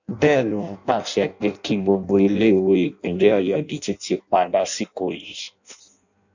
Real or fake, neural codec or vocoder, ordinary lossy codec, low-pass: fake; codec, 16 kHz in and 24 kHz out, 0.6 kbps, FireRedTTS-2 codec; none; 7.2 kHz